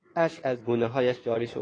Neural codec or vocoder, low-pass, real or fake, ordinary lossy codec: codec, 16 kHz in and 24 kHz out, 2.2 kbps, FireRedTTS-2 codec; 9.9 kHz; fake; AAC, 32 kbps